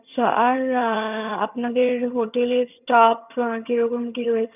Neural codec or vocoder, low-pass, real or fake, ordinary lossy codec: vocoder, 22.05 kHz, 80 mel bands, HiFi-GAN; 3.6 kHz; fake; none